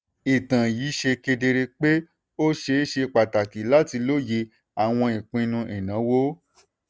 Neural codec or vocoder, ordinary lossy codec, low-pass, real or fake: none; none; none; real